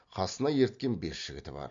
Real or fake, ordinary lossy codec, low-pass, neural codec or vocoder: real; MP3, 48 kbps; 7.2 kHz; none